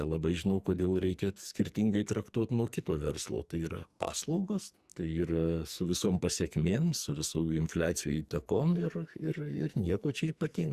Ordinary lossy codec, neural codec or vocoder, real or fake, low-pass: Opus, 64 kbps; codec, 44.1 kHz, 2.6 kbps, SNAC; fake; 14.4 kHz